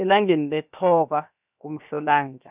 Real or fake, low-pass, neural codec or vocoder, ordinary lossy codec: fake; 3.6 kHz; codec, 16 kHz, 0.7 kbps, FocalCodec; none